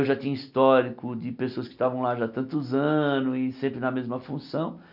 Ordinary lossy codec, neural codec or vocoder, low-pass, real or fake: none; none; 5.4 kHz; real